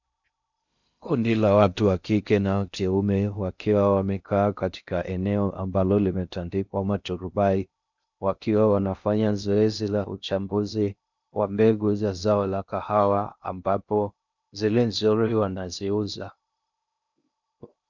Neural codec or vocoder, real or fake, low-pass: codec, 16 kHz in and 24 kHz out, 0.6 kbps, FocalCodec, streaming, 2048 codes; fake; 7.2 kHz